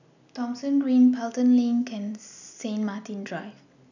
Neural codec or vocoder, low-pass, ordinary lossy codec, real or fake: none; 7.2 kHz; none; real